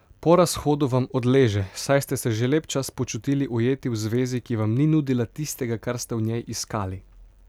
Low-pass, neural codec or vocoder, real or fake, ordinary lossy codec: 19.8 kHz; none; real; none